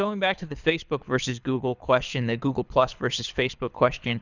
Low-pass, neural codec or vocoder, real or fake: 7.2 kHz; codec, 24 kHz, 6 kbps, HILCodec; fake